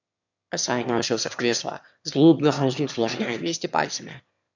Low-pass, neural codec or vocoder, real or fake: 7.2 kHz; autoencoder, 22.05 kHz, a latent of 192 numbers a frame, VITS, trained on one speaker; fake